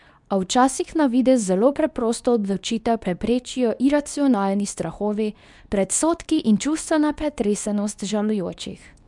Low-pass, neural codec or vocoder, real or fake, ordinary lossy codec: 10.8 kHz; codec, 24 kHz, 0.9 kbps, WavTokenizer, medium speech release version 2; fake; none